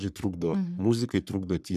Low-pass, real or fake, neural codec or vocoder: 14.4 kHz; fake; codec, 44.1 kHz, 3.4 kbps, Pupu-Codec